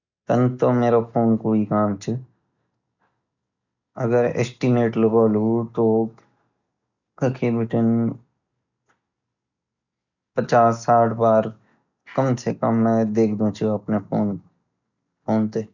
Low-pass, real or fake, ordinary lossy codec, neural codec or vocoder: 7.2 kHz; real; none; none